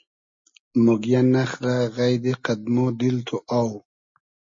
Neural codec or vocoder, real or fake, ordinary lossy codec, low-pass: none; real; MP3, 32 kbps; 7.2 kHz